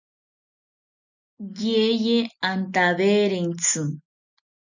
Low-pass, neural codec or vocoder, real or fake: 7.2 kHz; none; real